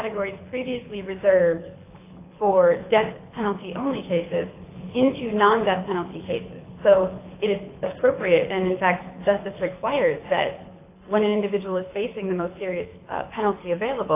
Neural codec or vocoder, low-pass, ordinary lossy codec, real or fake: codec, 24 kHz, 6 kbps, HILCodec; 3.6 kHz; AAC, 24 kbps; fake